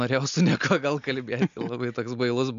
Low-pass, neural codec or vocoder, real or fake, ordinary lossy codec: 7.2 kHz; none; real; MP3, 64 kbps